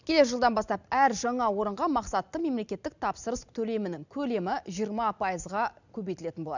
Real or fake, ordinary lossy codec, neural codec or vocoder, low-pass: real; none; none; 7.2 kHz